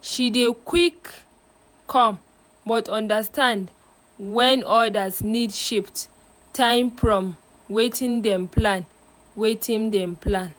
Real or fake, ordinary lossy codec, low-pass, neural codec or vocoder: fake; none; none; vocoder, 48 kHz, 128 mel bands, Vocos